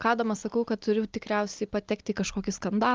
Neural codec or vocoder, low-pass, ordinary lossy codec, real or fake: none; 7.2 kHz; Opus, 24 kbps; real